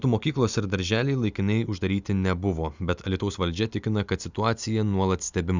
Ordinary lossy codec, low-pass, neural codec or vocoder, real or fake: Opus, 64 kbps; 7.2 kHz; none; real